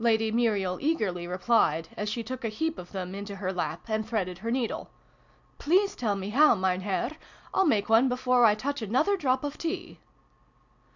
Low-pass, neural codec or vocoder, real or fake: 7.2 kHz; none; real